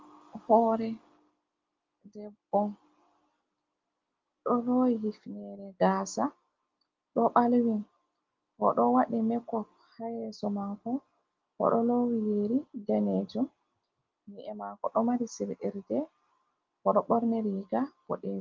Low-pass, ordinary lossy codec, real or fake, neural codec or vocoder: 7.2 kHz; Opus, 32 kbps; real; none